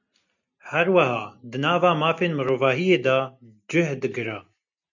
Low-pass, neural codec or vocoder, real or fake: 7.2 kHz; none; real